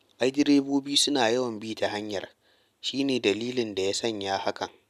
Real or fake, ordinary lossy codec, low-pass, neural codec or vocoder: real; none; 14.4 kHz; none